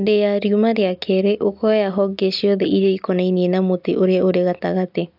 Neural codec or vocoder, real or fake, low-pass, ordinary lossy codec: none; real; 5.4 kHz; AAC, 48 kbps